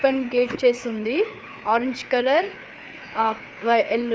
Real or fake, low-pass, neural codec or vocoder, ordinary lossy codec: fake; none; codec, 16 kHz, 4 kbps, FreqCodec, larger model; none